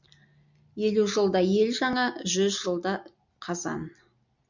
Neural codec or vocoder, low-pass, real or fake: none; 7.2 kHz; real